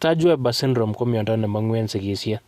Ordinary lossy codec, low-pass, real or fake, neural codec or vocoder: Opus, 64 kbps; 14.4 kHz; real; none